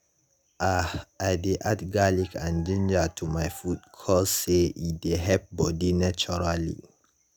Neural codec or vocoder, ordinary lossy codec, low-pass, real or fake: none; none; none; real